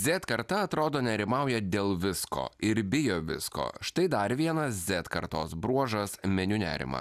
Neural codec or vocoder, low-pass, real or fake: none; 14.4 kHz; real